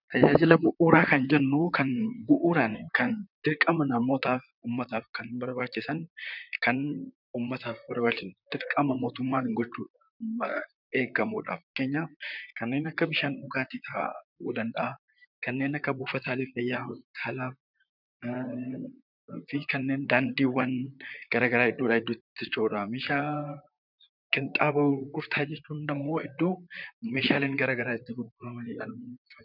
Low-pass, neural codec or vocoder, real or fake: 5.4 kHz; vocoder, 44.1 kHz, 128 mel bands, Pupu-Vocoder; fake